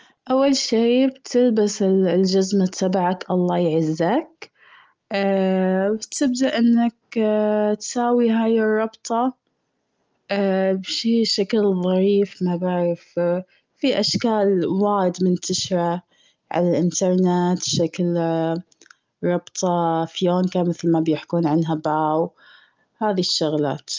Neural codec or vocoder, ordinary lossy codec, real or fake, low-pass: none; Opus, 24 kbps; real; 7.2 kHz